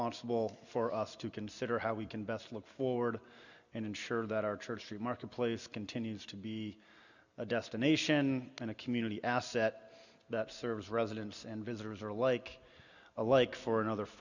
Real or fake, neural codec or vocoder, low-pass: real; none; 7.2 kHz